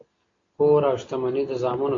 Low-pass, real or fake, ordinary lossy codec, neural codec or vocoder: 7.2 kHz; real; AAC, 48 kbps; none